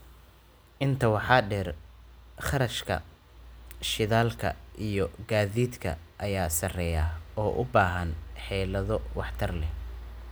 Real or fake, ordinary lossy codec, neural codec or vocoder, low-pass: real; none; none; none